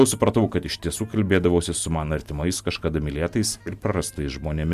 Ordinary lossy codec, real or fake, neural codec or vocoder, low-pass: Opus, 64 kbps; real; none; 14.4 kHz